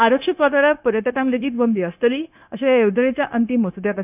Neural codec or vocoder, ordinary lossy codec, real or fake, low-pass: codec, 16 kHz, 0.9 kbps, LongCat-Audio-Codec; none; fake; 3.6 kHz